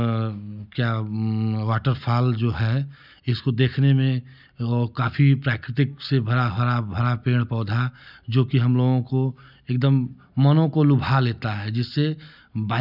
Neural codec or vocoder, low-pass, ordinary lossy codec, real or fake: none; 5.4 kHz; none; real